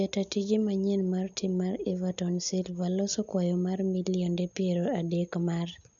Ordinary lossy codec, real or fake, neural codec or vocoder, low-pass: none; real; none; 7.2 kHz